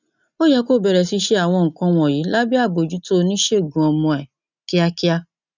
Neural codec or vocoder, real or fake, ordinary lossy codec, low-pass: none; real; none; 7.2 kHz